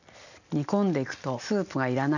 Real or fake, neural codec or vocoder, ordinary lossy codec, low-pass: real; none; none; 7.2 kHz